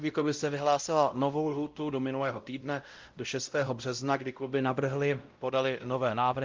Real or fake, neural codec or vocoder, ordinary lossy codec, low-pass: fake; codec, 16 kHz, 0.5 kbps, X-Codec, WavLM features, trained on Multilingual LibriSpeech; Opus, 32 kbps; 7.2 kHz